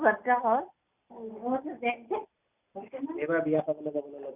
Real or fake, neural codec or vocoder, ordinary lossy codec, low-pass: real; none; none; 3.6 kHz